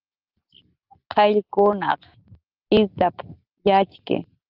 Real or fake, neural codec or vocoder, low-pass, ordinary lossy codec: real; none; 5.4 kHz; Opus, 32 kbps